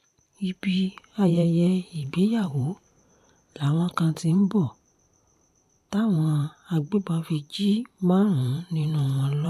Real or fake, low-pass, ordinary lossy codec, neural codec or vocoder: fake; 14.4 kHz; AAC, 96 kbps; vocoder, 44.1 kHz, 128 mel bands every 512 samples, BigVGAN v2